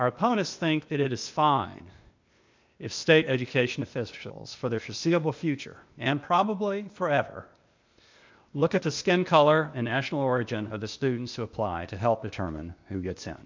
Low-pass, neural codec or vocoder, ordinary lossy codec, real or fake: 7.2 kHz; codec, 16 kHz, 0.8 kbps, ZipCodec; MP3, 64 kbps; fake